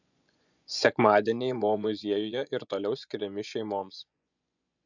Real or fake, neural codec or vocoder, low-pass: fake; vocoder, 24 kHz, 100 mel bands, Vocos; 7.2 kHz